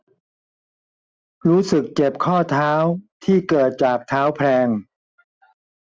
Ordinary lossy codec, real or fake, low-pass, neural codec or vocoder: none; real; none; none